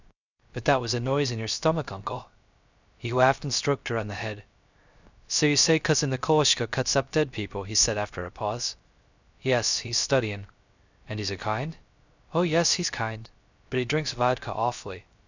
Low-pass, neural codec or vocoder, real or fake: 7.2 kHz; codec, 16 kHz, 0.3 kbps, FocalCodec; fake